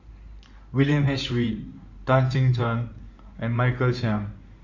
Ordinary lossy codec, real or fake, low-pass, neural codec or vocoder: none; fake; 7.2 kHz; codec, 16 kHz in and 24 kHz out, 2.2 kbps, FireRedTTS-2 codec